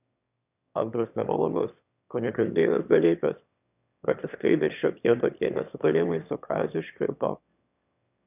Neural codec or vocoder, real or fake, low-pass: autoencoder, 22.05 kHz, a latent of 192 numbers a frame, VITS, trained on one speaker; fake; 3.6 kHz